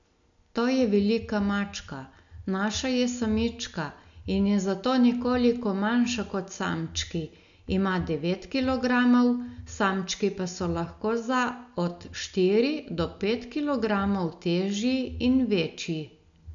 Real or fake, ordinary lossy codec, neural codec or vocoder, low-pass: real; none; none; 7.2 kHz